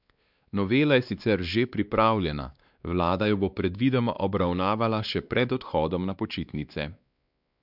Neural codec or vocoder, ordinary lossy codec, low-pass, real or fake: codec, 16 kHz, 2 kbps, X-Codec, WavLM features, trained on Multilingual LibriSpeech; none; 5.4 kHz; fake